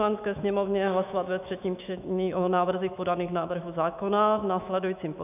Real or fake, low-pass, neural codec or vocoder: fake; 3.6 kHz; autoencoder, 48 kHz, 128 numbers a frame, DAC-VAE, trained on Japanese speech